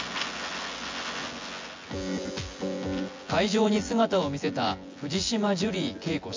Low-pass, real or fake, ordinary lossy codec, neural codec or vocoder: 7.2 kHz; fake; MP3, 48 kbps; vocoder, 24 kHz, 100 mel bands, Vocos